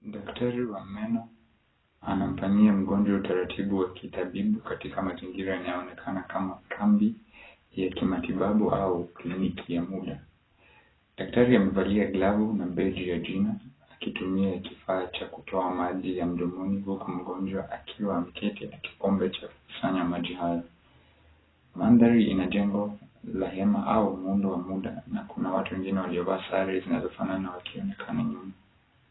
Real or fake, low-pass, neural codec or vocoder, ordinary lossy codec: real; 7.2 kHz; none; AAC, 16 kbps